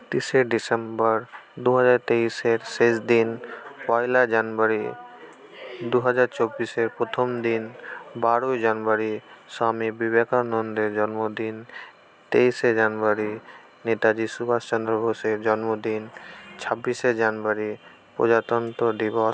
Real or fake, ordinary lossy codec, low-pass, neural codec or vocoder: real; none; none; none